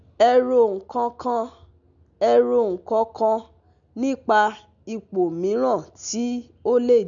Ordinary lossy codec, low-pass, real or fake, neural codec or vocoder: none; 7.2 kHz; real; none